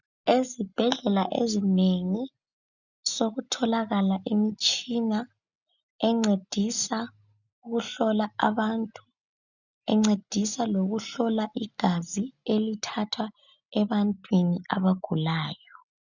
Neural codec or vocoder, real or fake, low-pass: none; real; 7.2 kHz